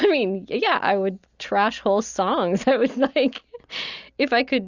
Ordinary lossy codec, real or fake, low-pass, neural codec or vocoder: Opus, 64 kbps; real; 7.2 kHz; none